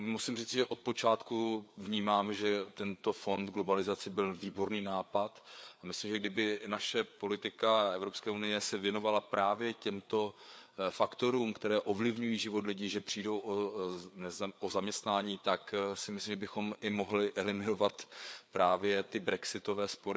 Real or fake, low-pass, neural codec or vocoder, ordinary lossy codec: fake; none; codec, 16 kHz, 4 kbps, FreqCodec, larger model; none